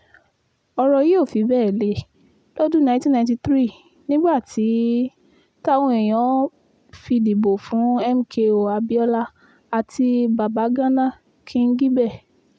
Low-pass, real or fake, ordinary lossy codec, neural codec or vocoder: none; real; none; none